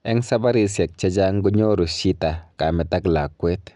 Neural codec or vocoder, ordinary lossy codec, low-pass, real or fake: none; none; 10.8 kHz; real